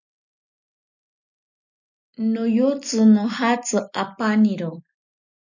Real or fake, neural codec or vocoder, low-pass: real; none; 7.2 kHz